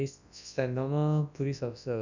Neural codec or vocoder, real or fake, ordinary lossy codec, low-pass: codec, 24 kHz, 0.9 kbps, WavTokenizer, large speech release; fake; none; 7.2 kHz